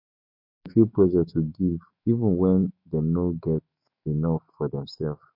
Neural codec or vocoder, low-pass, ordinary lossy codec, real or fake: autoencoder, 48 kHz, 128 numbers a frame, DAC-VAE, trained on Japanese speech; 5.4 kHz; MP3, 32 kbps; fake